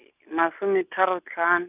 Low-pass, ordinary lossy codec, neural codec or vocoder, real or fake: 3.6 kHz; none; none; real